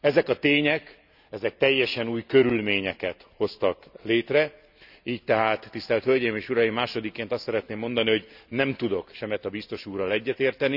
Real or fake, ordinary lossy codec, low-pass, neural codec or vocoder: real; none; 5.4 kHz; none